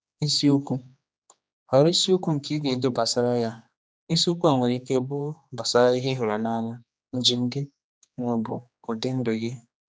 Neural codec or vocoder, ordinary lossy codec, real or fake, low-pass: codec, 16 kHz, 2 kbps, X-Codec, HuBERT features, trained on general audio; none; fake; none